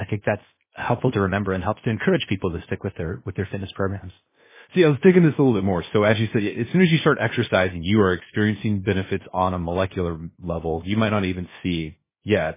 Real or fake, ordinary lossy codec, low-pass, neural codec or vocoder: fake; MP3, 16 kbps; 3.6 kHz; codec, 16 kHz, 0.7 kbps, FocalCodec